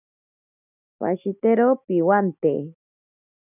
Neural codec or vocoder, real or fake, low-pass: none; real; 3.6 kHz